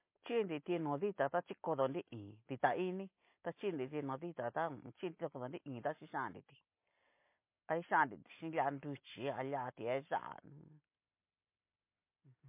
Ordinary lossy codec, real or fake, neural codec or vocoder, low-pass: MP3, 24 kbps; real; none; 3.6 kHz